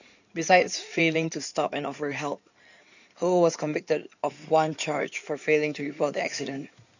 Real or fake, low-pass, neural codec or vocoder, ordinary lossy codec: fake; 7.2 kHz; codec, 16 kHz in and 24 kHz out, 2.2 kbps, FireRedTTS-2 codec; none